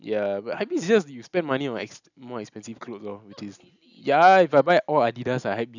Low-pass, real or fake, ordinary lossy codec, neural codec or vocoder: 7.2 kHz; real; none; none